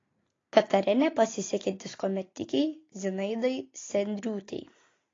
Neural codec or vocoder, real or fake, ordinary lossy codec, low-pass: none; real; AAC, 32 kbps; 7.2 kHz